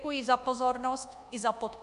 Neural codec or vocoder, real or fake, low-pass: codec, 24 kHz, 1.2 kbps, DualCodec; fake; 10.8 kHz